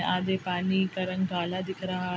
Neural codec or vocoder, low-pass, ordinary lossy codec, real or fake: none; none; none; real